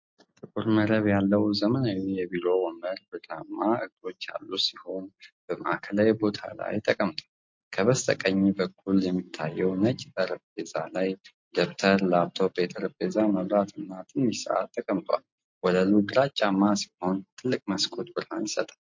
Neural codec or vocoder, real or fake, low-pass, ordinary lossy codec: none; real; 7.2 kHz; MP3, 48 kbps